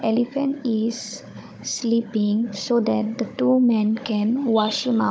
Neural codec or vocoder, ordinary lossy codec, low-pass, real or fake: codec, 16 kHz, 16 kbps, FunCodec, trained on Chinese and English, 50 frames a second; none; none; fake